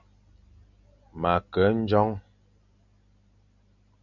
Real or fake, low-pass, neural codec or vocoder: real; 7.2 kHz; none